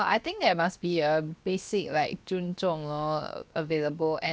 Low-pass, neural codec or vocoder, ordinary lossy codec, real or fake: none; codec, 16 kHz, 0.7 kbps, FocalCodec; none; fake